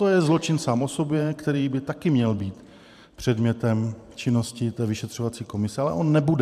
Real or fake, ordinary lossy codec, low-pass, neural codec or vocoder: fake; MP3, 96 kbps; 14.4 kHz; vocoder, 44.1 kHz, 128 mel bands every 512 samples, BigVGAN v2